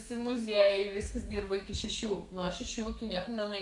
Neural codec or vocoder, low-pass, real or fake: codec, 32 kHz, 1.9 kbps, SNAC; 10.8 kHz; fake